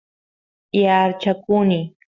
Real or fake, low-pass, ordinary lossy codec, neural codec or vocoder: real; 7.2 kHz; Opus, 64 kbps; none